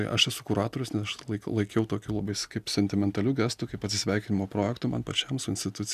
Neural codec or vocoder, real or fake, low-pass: none; real; 14.4 kHz